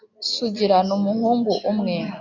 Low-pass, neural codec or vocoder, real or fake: 7.2 kHz; none; real